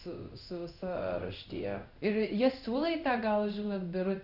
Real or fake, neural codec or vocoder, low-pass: fake; codec, 16 kHz in and 24 kHz out, 1 kbps, XY-Tokenizer; 5.4 kHz